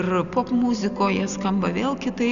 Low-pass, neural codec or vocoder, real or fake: 7.2 kHz; none; real